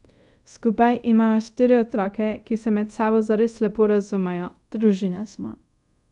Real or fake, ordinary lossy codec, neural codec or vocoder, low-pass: fake; none; codec, 24 kHz, 0.5 kbps, DualCodec; 10.8 kHz